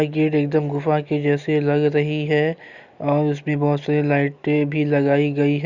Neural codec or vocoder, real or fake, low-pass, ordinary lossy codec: none; real; 7.2 kHz; Opus, 64 kbps